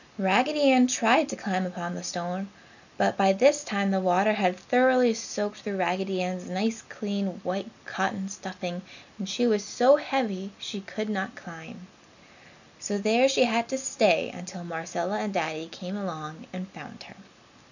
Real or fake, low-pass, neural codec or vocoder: real; 7.2 kHz; none